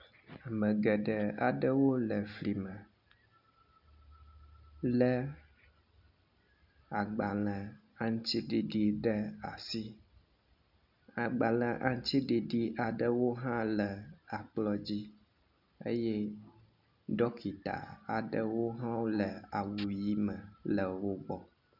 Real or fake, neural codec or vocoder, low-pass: real; none; 5.4 kHz